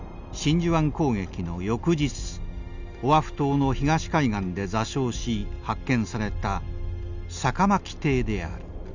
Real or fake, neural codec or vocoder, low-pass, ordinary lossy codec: real; none; 7.2 kHz; none